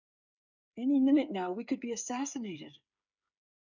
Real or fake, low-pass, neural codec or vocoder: fake; 7.2 kHz; codec, 16 kHz, 8 kbps, FreqCodec, smaller model